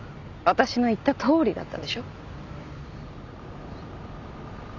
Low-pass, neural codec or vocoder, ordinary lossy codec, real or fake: 7.2 kHz; none; none; real